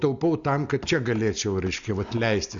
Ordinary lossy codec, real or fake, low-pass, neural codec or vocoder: MP3, 96 kbps; real; 7.2 kHz; none